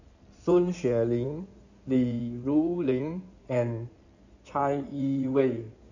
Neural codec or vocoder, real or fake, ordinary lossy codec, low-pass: codec, 16 kHz in and 24 kHz out, 2.2 kbps, FireRedTTS-2 codec; fake; none; 7.2 kHz